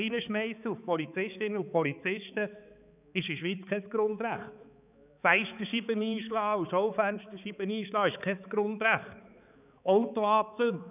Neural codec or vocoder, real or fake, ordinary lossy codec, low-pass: codec, 16 kHz, 4 kbps, X-Codec, HuBERT features, trained on balanced general audio; fake; none; 3.6 kHz